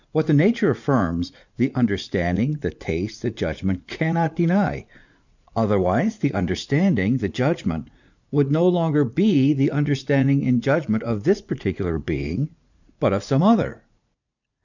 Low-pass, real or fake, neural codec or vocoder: 7.2 kHz; fake; vocoder, 44.1 kHz, 80 mel bands, Vocos